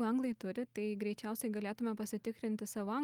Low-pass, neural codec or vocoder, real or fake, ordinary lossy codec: 19.8 kHz; none; real; Opus, 32 kbps